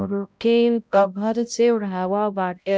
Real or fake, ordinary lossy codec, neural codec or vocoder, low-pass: fake; none; codec, 16 kHz, 0.5 kbps, X-Codec, HuBERT features, trained on balanced general audio; none